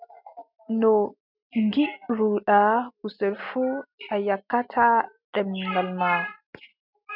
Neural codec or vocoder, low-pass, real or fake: none; 5.4 kHz; real